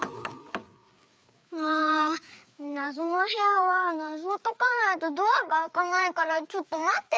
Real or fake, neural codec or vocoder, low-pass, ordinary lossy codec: fake; codec, 16 kHz, 4 kbps, FreqCodec, larger model; none; none